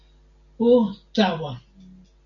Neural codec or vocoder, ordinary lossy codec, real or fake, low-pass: none; MP3, 96 kbps; real; 7.2 kHz